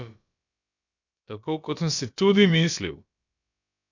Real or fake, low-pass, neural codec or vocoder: fake; 7.2 kHz; codec, 16 kHz, about 1 kbps, DyCAST, with the encoder's durations